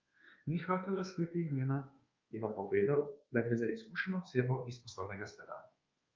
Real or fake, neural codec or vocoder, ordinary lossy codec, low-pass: fake; codec, 24 kHz, 1.2 kbps, DualCodec; Opus, 24 kbps; 7.2 kHz